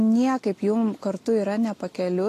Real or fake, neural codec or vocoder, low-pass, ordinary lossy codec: real; none; 14.4 kHz; AAC, 48 kbps